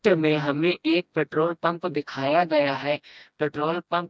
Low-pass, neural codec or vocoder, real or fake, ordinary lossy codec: none; codec, 16 kHz, 1 kbps, FreqCodec, smaller model; fake; none